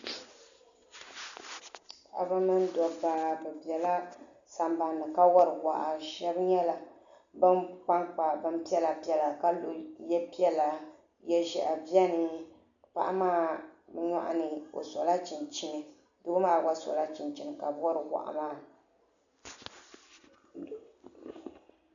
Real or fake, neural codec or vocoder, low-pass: real; none; 7.2 kHz